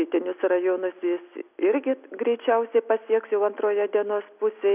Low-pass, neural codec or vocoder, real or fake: 3.6 kHz; none; real